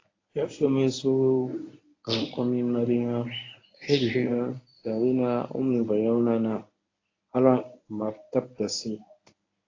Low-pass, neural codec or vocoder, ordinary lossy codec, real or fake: 7.2 kHz; codec, 24 kHz, 0.9 kbps, WavTokenizer, medium speech release version 1; AAC, 32 kbps; fake